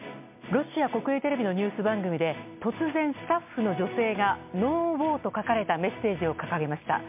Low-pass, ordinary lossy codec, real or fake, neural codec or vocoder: 3.6 kHz; MP3, 32 kbps; real; none